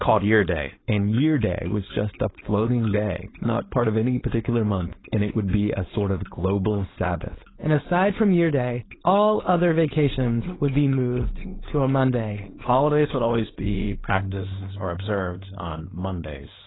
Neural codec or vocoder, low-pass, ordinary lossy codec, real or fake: codec, 16 kHz, 4.8 kbps, FACodec; 7.2 kHz; AAC, 16 kbps; fake